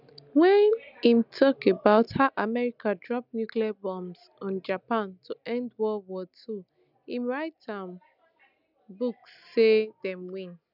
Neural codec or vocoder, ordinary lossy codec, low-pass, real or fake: none; none; 5.4 kHz; real